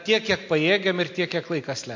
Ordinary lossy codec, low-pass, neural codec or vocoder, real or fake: MP3, 48 kbps; 7.2 kHz; none; real